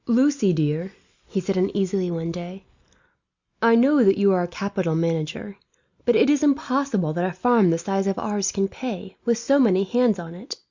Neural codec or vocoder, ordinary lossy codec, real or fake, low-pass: none; Opus, 64 kbps; real; 7.2 kHz